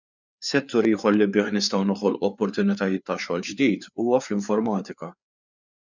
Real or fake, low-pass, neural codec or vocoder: fake; 7.2 kHz; codec, 16 kHz, 4 kbps, FreqCodec, larger model